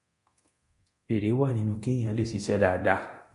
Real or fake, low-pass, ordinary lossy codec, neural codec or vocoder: fake; 10.8 kHz; MP3, 48 kbps; codec, 24 kHz, 0.9 kbps, DualCodec